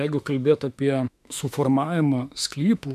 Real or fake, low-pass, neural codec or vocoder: fake; 14.4 kHz; autoencoder, 48 kHz, 32 numbers a frame, DAC-VAE, trained on Japanese speech